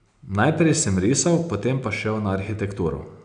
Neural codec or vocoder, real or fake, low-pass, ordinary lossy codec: none; real; 9.9 kHz; none